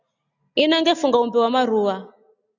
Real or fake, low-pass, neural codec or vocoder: real; 7.2 kHz; none